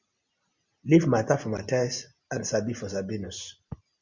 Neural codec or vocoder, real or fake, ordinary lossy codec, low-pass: none; real; Opus, 64 kbps; 7.2 kHz